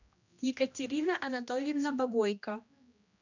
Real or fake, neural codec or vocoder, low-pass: fake; codec, 16 kHz, 1 kbps, X-Codec, HuBERT features, trained on general audio; 7.2 kHz